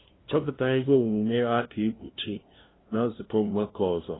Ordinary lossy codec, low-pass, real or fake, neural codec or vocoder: AAC, 16 kbps; 7.2 kHz; fake; codec, 16 kHz, 0.5 kbps, FunCodec, trained on LibriTTS, 25 frames a second